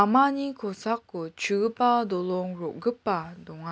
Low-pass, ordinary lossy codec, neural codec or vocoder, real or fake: none; none; none; real